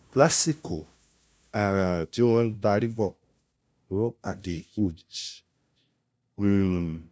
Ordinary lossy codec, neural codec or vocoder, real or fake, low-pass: none; codec, 16 kHz, 0.5 kbps, FunCodec, trained on LibriTTS, 25 frames a second; fake; none